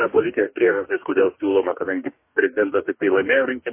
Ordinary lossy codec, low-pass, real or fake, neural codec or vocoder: MP3, 24 kbps; 3.6 kHz; fake; codec, 44.1 kHz, 2.6 kbps, DAC